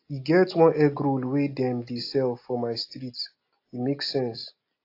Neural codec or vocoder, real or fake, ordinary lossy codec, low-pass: none; real; AAC, 32 kbps; 5.4 kHz